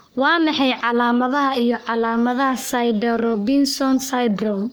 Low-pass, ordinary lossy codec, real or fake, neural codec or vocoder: none; none; fake; codec, 44.1 kHz, 3.4 kbps, Pupu-Codec